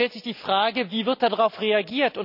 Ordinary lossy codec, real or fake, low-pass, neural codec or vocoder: none; real; 5.4 kHz; none